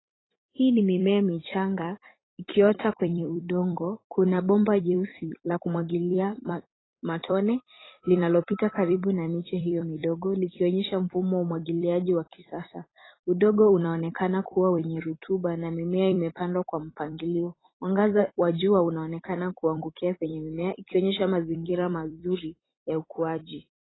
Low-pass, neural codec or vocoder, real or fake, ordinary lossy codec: 7.2 kHz; vocoder, 44.1 kHz, 128 mel bands every 256 samples, BigVGAN v2; fake; AAC, 16 kbps